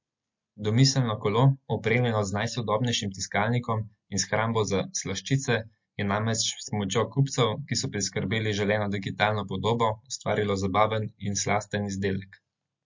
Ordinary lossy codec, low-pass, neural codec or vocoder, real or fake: MP3, 48 kbps; 7.2 kHz; none; real